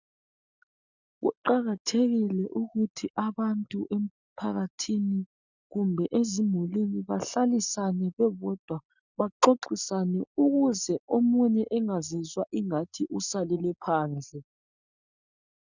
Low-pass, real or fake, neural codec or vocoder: 7.2 kHz; real; none